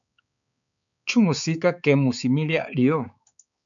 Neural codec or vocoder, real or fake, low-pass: codec, 16 kHz, 4 kbps, X-Codec, HuBERT features, trained on balanced general audio; fake; 7.2 kHz